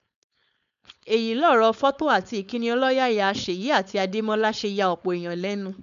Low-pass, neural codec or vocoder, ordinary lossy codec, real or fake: 7.2 kHz; codec, 16 kHz, 4.8 kbps, FACodec; none; fake